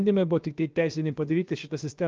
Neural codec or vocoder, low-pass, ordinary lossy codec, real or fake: codec, 16 kHz, 0.7 kbps, FocalCodec; 7.2 kHz; Opus, 24 kbps; fake